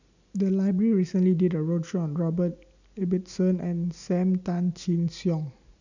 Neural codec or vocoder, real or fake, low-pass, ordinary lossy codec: none; real; 7.2 kHz; none